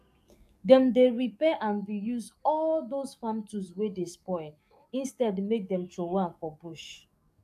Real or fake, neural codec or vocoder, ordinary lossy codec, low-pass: fake; codec, 44.1 kHz, 7.8 kbps, DAC; AAC, 96 kbps; 14.4 kHz